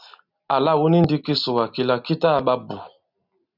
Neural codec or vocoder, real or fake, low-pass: none; real; 5.4 kHz